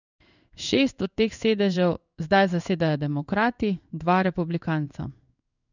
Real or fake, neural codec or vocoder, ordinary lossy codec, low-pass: fake; codec, 16 kHz in and 24 kHz out, 1 kbps, XY-Tokenizer; none; 7.2 kHz